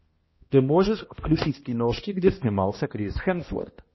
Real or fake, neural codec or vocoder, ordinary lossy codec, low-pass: fake; codec, 16 kHz, 1 kbps, X-Codec, HuBERT features, trained on balanced general audio; MP3, 24 kbps; 7.2 kHz